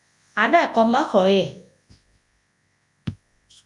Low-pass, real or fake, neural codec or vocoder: 10.8 kHz; fake; codec, 24 kHz, 0.9 kbps, WavTokenizer, large speech release